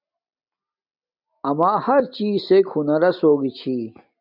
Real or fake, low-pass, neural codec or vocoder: real; 5.4 kHz; none